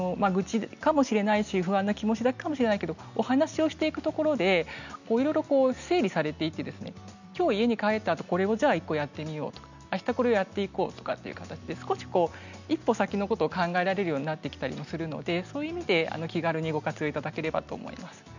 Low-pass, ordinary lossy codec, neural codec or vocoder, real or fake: 7.2 kHz; none; none; real